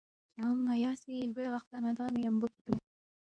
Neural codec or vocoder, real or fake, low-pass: codec, 24 kHz, 0.9 kbps, WavTokenizer, medium speech release version 2; fake; 9.9 kHz